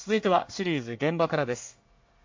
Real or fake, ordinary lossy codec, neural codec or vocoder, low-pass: fake; MP3, 48 kbps; codec, 24 kHz, 1 kbps, SNAC; 7.2 kHz